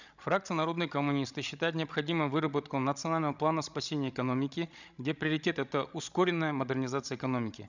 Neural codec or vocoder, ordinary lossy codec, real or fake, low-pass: codec, 16 kHz, 16 kbps, FreqCodec, larger model; none; fake; 7.2 kHz